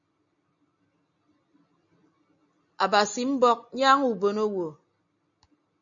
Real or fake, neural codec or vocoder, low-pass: real; none; 7.2 kHz